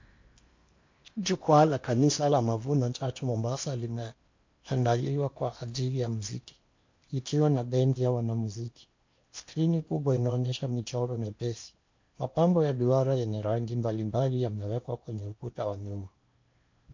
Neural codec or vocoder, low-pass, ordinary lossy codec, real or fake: codec, 16 kHz in and 24 kHz out, 0.8 kbps, FocalCodec, streaming, 65536 codes; 7.2 kHz; MP3, 48 kbps; fake